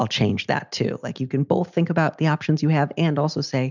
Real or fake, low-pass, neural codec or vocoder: real; 7.2 kHz; none